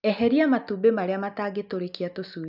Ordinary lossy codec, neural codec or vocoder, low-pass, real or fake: none; none; 5.4 kHz; real